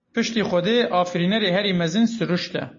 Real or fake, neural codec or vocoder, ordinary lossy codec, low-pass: real; none; MP3, 32 kbps; 7.2 kHz